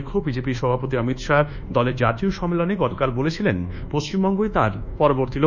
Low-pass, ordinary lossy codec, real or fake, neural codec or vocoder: 7.2 kHz; none; fake; codec, 24 kHz, 1.2 kbps, DualCodec